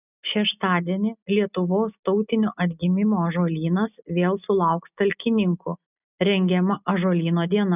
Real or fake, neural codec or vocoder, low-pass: real; none; 3.6 kHz